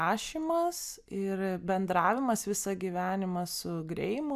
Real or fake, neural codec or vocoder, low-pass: real; none; 14.4 kHz